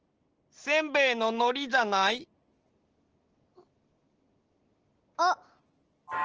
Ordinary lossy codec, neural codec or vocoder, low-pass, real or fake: Opus, 16 kbps; none; 7.2 kHz; real